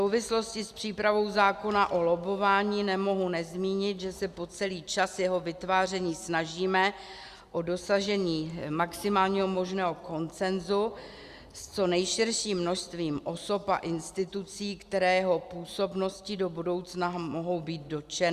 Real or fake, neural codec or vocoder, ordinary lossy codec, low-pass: real; none; Opus, 64 kbps; 14.4 kHz